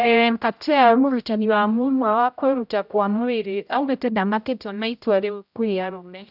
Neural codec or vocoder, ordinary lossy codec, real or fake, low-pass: codec, 16 kHz, 0.5 kbps, X-Codec, HuBERT features, trained on general audio; none; fake; 5.4 kHz